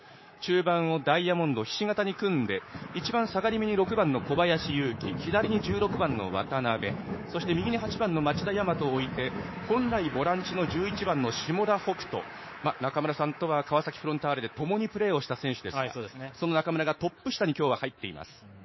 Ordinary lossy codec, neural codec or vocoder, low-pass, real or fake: MP3, 24 kbps; codec, 24 kHz, 3.1 kbps, DualCodec; 7.2 kHz; fake